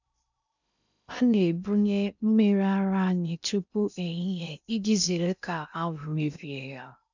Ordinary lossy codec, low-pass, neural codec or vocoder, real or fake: none; 7.2 kHz; codec, 16 kHz in and 24 kHz out, 0.6 kbps, FocalCodec, streaming, 2048 codes; fake